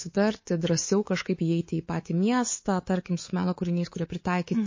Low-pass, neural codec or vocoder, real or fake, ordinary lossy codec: 7.2 kHz; codec, 24 kHz, 3.1 kbps, DualCodec; fake; MP3, 32 kbps